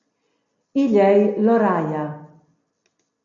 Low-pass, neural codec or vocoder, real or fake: 7.2 kHz; none; real